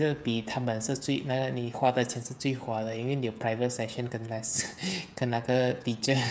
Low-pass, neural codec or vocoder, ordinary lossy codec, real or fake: none; codec, 16 kHz, 16 kbps, FreqCodec, smaller model; none; fake